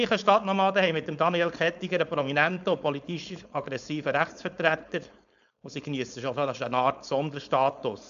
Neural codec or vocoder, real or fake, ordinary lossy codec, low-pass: codec, 16 kHz, 4.8 kbps, FACodec; fake; AAC, 96 kbps; 7.2 kHz